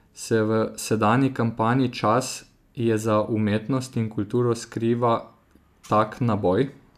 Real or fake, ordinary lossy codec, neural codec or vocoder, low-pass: real; AAC, 96 kbps; none; 14.4 kHz